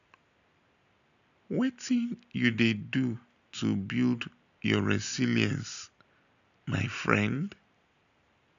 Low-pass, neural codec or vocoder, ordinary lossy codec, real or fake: 7.2 kHz; none; none; real